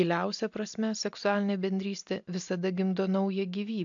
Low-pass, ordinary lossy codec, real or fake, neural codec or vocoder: 7.2 kHz; AAC, 64 kbps; real; none